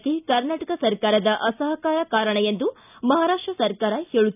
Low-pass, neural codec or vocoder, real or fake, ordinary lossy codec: 3.6 kHz; none; real; none